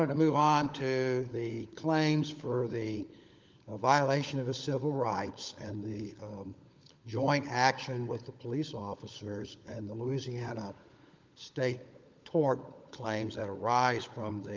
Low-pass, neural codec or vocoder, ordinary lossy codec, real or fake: 7.2 kHz; codec, 16 kHz, 16 kbps, FunCodec, trained on LibriTTS, 50 frames a second; Opus, 24 kbps; fake